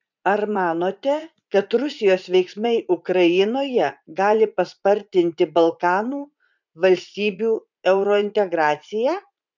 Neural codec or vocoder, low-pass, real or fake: vocoder, 44.1 kHz, 80 mel bands, Vocos; 7.2 kHz; fake